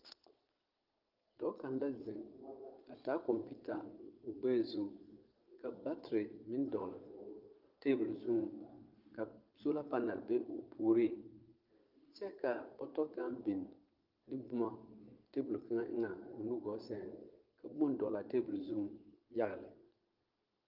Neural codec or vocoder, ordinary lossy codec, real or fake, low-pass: vocoder, 22.05 kHz, 80 mel bands, Vocos; Opus, 32 kbps; fake; 5.4 kHz